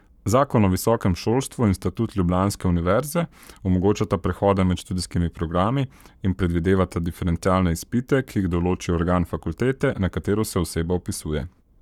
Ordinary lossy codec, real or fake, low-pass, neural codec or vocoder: none; fake; 19.8 kHz; codec, 44.1 kHz, 7.8 kbps, Pupu-Codec